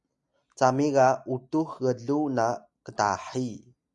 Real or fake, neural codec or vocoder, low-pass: real; none; 10.8 kHz